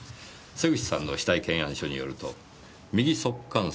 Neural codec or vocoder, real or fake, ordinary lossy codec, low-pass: none; real; none; none